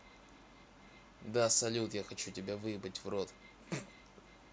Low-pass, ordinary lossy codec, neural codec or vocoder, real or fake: none; none; none; real